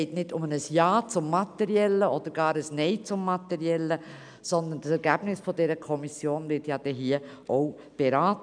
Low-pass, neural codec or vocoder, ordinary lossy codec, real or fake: 9.9 kHz; none; none; real